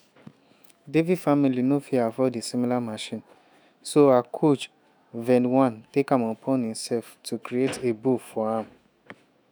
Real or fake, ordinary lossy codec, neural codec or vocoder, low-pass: fake; none; autoencoder, 48 kHz, 128 numbers a frame, DAC-VAE, trained on Japanese speech; none